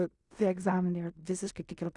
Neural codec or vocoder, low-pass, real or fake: codec, 16 kHz in and 24 kHz out, 0.4 kbps, LongCat-Audio-Codec, fine tuned four codebook decoder; 10.8 kHz; fake